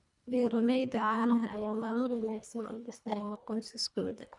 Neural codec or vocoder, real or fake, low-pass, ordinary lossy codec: codec, 24 kHz, 1.5 kbps, HILCodec; fake; 10.8 kHz; none